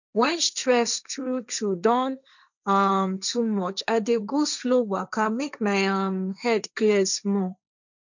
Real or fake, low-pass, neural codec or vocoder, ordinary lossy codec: fake; 7.2 kHz; codec, 16 kHz, 1.1 kbps, Voila-Tokenizer; none